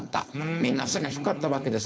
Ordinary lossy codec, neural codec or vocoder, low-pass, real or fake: none; codec, 16 kHz, 4.8 kbps, FACodec; none; fake